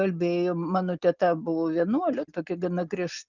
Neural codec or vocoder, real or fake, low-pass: none; real; 7.2 kHz